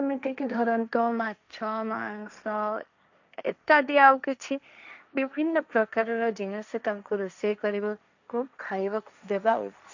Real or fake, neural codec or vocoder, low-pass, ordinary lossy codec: fake; codec, 16 kHz, 1.1 kbps, Voila-Tokenizer; 7.2 kHz; none